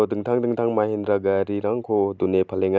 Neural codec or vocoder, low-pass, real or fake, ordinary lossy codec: none; none; real; none